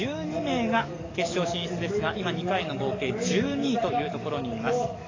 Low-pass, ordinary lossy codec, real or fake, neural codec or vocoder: 7.2 kHz; AAC, 32 kbps; fake; codec, 44.1 kHz, 7.8 kbps, DAC